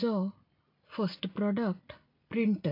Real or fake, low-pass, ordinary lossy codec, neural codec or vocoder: real; 5.4 kHz; AAC, 32 kbps; none